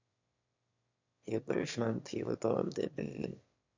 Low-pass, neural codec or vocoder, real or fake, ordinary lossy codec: 7.2 kHz; autoencoder, 22.05 kHz, a latent of 192 numbers a frame, VITS, trained on one speaker; fake; MP3, 48 kbps